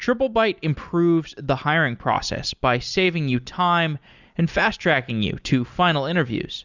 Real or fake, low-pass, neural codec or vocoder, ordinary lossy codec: real; 7.2 kHz; none; Opus, 64 kbps